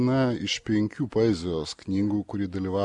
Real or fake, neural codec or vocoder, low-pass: real; none; 10.8 kHz